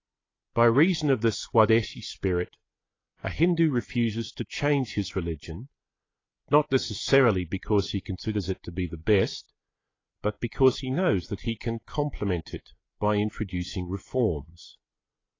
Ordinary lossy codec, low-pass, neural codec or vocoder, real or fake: AAC, 32 kbps; 7.2 kHz; none; real